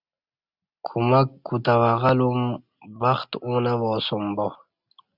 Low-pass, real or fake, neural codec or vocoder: 5.4 kHz; real; none